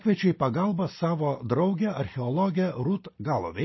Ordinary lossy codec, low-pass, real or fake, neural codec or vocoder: MP3, 24 kbps; 7.2 kHz; real; none